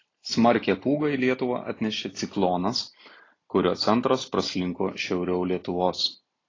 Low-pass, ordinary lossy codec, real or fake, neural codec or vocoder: 7.2 kHz; AAC, 32 kbps; real; none